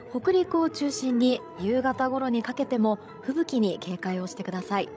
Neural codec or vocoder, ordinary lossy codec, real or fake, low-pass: codec, 16 kHz, 16 kbps, FreqCodec, larger model; none; fake; none